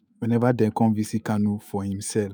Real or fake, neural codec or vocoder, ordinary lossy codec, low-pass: fake; autoencoder, 48 kHz, 128 numbers a frame, DAC-VAE, trained on Japanese speech; none; none